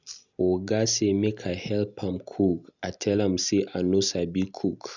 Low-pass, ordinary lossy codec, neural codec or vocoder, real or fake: 7.2 kHz; none; none; real